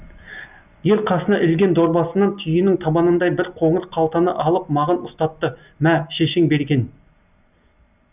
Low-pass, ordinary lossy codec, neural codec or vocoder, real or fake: 3.6 kHz; none; none; real